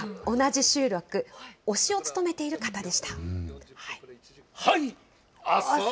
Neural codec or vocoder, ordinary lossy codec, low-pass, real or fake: none; none; none; real